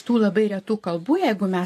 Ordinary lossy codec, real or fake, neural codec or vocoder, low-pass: AAC, 64 kbps; fake; vocoder, 44.1 kHz, 128 mel bands every 512 samples, BigVGAN v2; 14.4 kHz